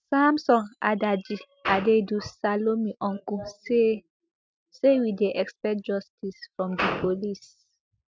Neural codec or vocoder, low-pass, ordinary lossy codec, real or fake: none; none; none; real